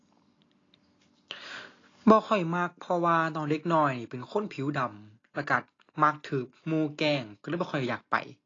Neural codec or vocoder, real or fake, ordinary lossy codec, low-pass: none; real; AAC, 32 kbps; 7.2 kHz